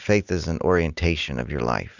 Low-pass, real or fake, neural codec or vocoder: 7.2 kHz; real; none